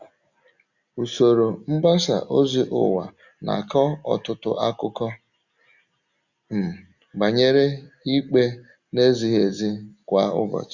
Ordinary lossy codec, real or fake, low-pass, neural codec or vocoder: Opus, 64 kbps; real; 7.2 kHz; none